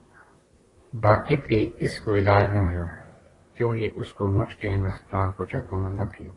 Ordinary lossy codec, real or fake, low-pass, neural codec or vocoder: AAC, 32 kbps; fake; 10.8 kHz; codec, 24 kHz, 1 kbps, SNAC